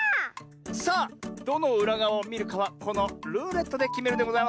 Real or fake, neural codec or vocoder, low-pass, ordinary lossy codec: real; none; none; none